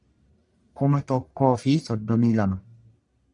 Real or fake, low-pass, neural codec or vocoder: fake; 10.8 kHz; codec, 44.1 kHz, 1.7 kbps, Pupu-Codec